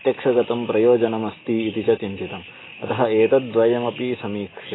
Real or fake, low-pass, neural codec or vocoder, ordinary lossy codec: real; 7.2 kHz; none; AAC, 16 kbps